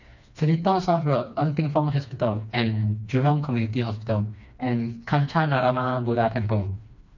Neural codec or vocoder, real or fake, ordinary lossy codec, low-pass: codec, 16 kHz, 2 kbps, FreqCodec, smaller model; fake; none; 7.2 kHz